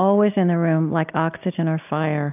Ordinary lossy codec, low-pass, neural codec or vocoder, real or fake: AAC, 32 kbps; 3.6 kHz; none; real